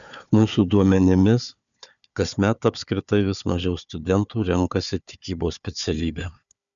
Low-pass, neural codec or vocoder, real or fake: 7.2 kHz; codec, 16 kHz, 4 kbps, FunCodec, trained on Chinese and English, 50 frames a second; fake